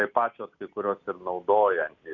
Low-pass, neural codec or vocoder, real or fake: 7.2 kHz; none; real